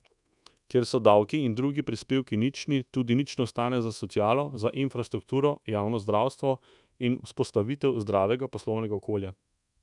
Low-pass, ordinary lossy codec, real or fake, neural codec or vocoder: 10.8 kHz; none; fake; codec, 24 kHz, 1.2 kbps, DualCodec